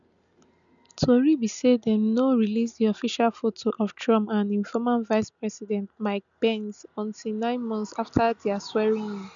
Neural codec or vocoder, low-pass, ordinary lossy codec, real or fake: none; 7.2 kHz; none; real